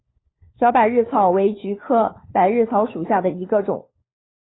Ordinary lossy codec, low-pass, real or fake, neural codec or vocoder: AAC, 16 kbps; 7.2 kHz; fake; codec, 16 kHz, 4 kbps, FunCodec, trained on LibriTTS, 50 frames a second